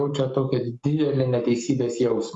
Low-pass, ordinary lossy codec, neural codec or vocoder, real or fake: 10.8 kHz; Opus, 64 kbps; codec, 44.1 kHz, 7.8 kbps, Pupu-Codec; fake